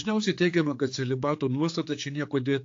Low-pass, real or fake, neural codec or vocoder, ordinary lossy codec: 7.2 kHz; fake; codec, 16 kHz, 4 kbps, X-Codec, HuBERT features, trained on general audio; AAC, 48 kbps